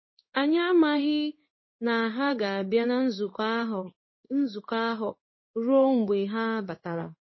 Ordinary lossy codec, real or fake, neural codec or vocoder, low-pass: MP3, 24 kbps; fake; codec, 16 kHz in and 24 kHz out, 1 kbps, XY-Tokenizer; 7.2 kHz